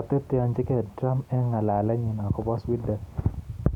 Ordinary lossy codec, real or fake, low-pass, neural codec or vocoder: none; real; 19.8 kHz; none